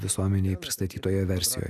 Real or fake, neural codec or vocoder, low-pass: real; none; 14.4 kHz